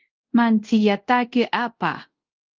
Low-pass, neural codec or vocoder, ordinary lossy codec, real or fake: 7.2 kHz; codec, 24 kHz, 0.5 kbps, DualCodec; Opus, 24 kbps; fake